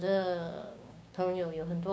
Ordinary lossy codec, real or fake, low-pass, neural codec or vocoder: none; fake; none; codec, 16 kHz, 6 kbps, DAC